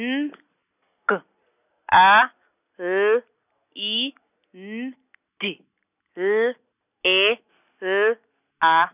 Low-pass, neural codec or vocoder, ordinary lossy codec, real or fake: 3.6 kHz; none; none; real